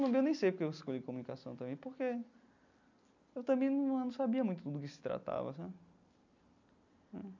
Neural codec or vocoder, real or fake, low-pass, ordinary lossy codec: none; real; 7.2 kHz; none